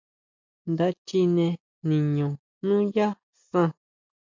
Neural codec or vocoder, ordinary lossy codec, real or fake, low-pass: none; MP3, 64 kbps; real; 7.2 kHz